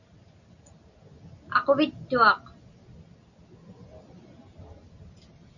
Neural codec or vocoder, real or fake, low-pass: none; real; 7.2 kHz